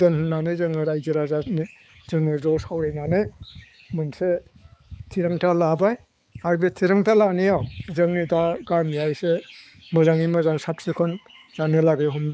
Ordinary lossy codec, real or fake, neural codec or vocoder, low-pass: none; fake; codec, 16 kHz, 4 kbps, X-Codec, HuBERT features, trained on balanced general audio; none